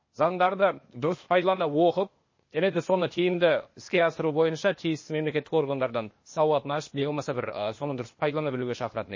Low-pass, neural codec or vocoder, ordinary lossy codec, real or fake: 7.2 kHz; codec, 16 kHz, 0.8 kbps, ZipCodec; MP3, 32 kbps; fake